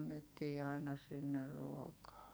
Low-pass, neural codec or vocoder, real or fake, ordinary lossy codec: none; codec, 44.1 kHz, 2.6 kbps, SNAC; fake; none